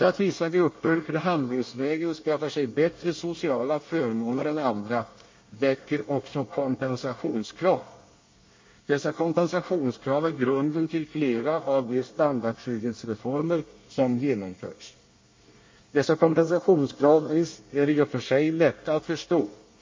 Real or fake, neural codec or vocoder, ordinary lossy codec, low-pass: fake; codec, 24 kHz, 1 kbps, SNAC; MP3, 32 kbps; 7.2 kHz